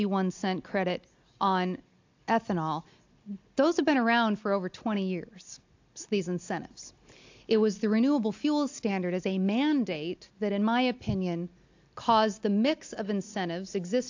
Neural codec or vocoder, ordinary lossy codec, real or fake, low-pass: none; AAC, 48 kbps; real; 7.2 kHz